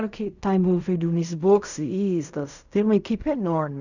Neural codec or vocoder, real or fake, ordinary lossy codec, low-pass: codec, 16 kHz in and 24 kHz out, 0.4 kbps, LongCat-Audio-Codec, fine tuned four codebook decoder; fake; none; 7.2 kHz